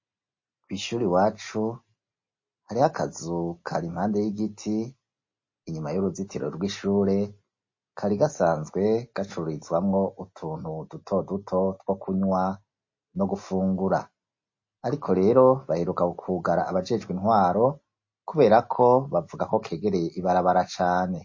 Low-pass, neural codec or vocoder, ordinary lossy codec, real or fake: 7.2 kHz; none; MP3, 32 kbps; real